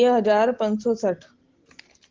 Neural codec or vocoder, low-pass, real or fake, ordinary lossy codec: none; 7.2 kHz; real; Opus, 16 kbps